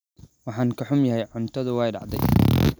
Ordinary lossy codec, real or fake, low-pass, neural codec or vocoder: none; real; none; none